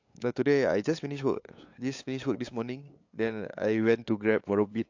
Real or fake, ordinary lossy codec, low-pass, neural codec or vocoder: fake; AAC, 48 kbps; 7.2 kHz; codec, 16 kHz, 8 kbps, FunCodec, trained on LibriTTS, 25 frames a second